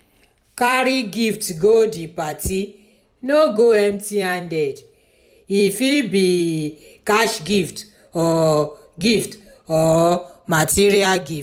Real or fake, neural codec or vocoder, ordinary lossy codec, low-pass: fake; vocoder, 44.1 kHz, 128 mel bands every 256 samples, BigVGAN v2; none; 19.8 kHz